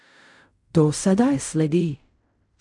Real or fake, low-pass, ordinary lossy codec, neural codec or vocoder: fake; 10.8 kHz; none; codec, 16 kHz in and 24 kHz out, 0.4 kbps, LongCat-Audio-Codec, fine tuned four codebook decoder